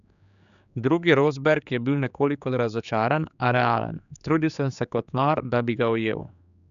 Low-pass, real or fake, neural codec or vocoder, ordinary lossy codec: 7.2 kHz; fake; codec, 16 kHz, 4 kbps, X-Codec, HuBERT features, trained on general audio; none